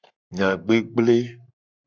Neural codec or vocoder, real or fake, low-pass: codec, 44.1 kHz, 7.8 kbps, Pupu-Codec; fake; 7.2 kHz